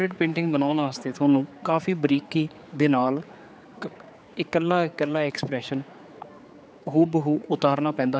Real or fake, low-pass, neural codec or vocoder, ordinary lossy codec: fake; none; codec, 16 kHz, 4 kbps, X-Codec, HuBERT features, trained on general audio; none